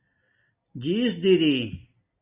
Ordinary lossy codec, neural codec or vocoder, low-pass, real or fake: Opus, 64 kbps; none; 3.6 kHz; real